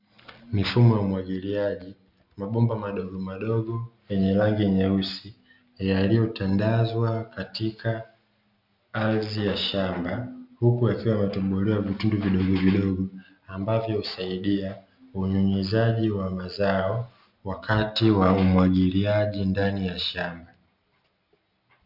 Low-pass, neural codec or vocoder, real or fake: 5.4 kHz; none; real